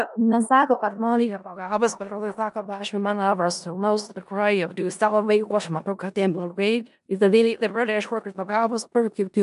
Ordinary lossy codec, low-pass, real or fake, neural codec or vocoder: AAC, 96 kbps; 10.8 kHz; fake; codec, 16 kHz in and 24 kHz out, 0.4 kbps, LongCat-Audio-Codec, four codebook decoder